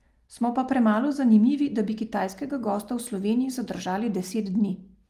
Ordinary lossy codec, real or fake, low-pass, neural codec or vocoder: Opus, 32 kbps; real; 14.4 kHz; none